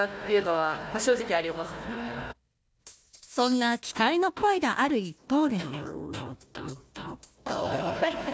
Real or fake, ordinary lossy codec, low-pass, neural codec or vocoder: fake; none; none; codec, 16 kHz, 1 kbps, FunCodec, trained on Chinese and English, 50 frames a second